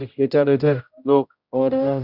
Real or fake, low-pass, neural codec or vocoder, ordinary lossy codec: fake; 5.4 kHz; codec, 16 kHz, 0.5 kbps, X-Codec, HuBERT features, trained on general audio; Opus, 64 kbps